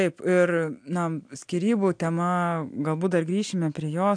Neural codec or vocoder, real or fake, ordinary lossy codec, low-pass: none; real; AAC, 64 kbps; 9.9 kHz